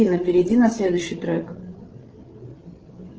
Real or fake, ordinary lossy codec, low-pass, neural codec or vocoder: fake; Opus, 16 kbps; 7.2 kHz; codec, 16 kHz, 8 kbps, FreqCodec, larger model